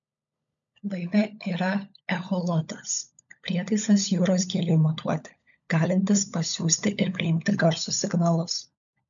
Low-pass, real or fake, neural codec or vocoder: 7.2 kHz; fake; codec, 16 kHz, 16 kbps, FunCodec, trained on LibriTTS, 50 frames a second